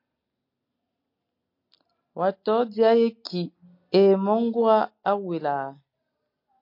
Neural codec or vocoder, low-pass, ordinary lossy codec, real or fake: none; 5.4 kHz; MP3, 32 kbps; real